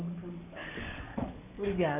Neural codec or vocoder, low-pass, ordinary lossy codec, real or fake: codec, 44.1 kHz, 7.8 kbps, DAC; 3.6 kHz; none; fake